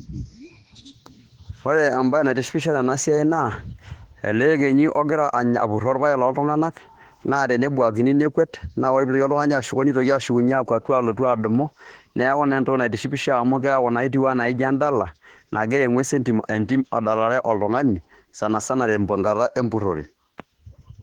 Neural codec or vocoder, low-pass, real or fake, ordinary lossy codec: autoencoder, 48 kHz, 32 numbers a frame, DAC-VAE, trained on Japanese speech; 19.8 kHz; fake; Opus, 16 kbps